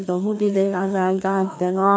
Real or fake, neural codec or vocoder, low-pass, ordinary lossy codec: fake; codec, 16 kHz, 2 kbps, FreqCodec, larger model; none; none